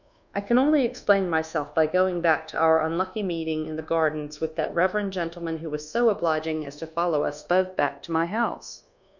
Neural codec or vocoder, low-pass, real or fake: codec, 24 kHz, 1.2 kbps, DualCodec; 7.2 kHz; fake